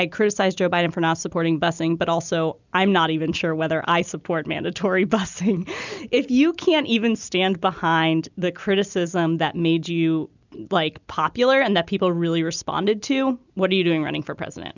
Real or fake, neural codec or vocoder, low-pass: real; none; 7.2 kHz